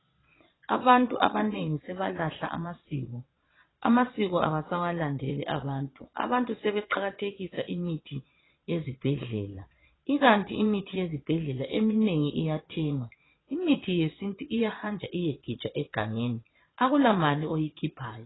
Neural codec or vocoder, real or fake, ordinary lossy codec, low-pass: vocoder, 22.05 kHz, 80 mel bands, Vocos; fake; AAC, 16 kbps; 7.2 kHz